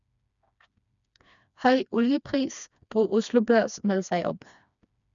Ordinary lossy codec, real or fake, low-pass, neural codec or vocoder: none; fake; 7.2 kHz; codec, 16 kHz, 2 kbps, FreqCodec, smaller model